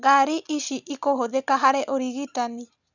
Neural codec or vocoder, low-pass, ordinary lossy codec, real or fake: none; 7.2 kHz; none; real